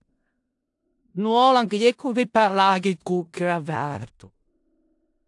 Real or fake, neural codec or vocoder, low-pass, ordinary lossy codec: fake; codec, 16 kHz in and 24 kHz out, 0.4 kbps, LongCat-Audio-Codec, four codebook decoder; 10.8 kHz; AAC, 64 kbps